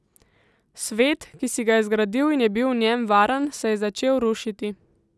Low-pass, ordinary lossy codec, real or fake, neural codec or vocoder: none; none; real; none